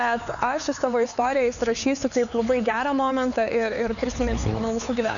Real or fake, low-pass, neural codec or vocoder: fake; 7.2 kHz; codec, 16 kHz, 4 kbps, X-Codec, HuBERT features, trained on LibriSpeech